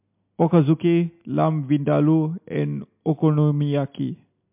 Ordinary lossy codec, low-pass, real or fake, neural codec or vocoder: MP3, 32 kbps; 3.6 kHz; real; none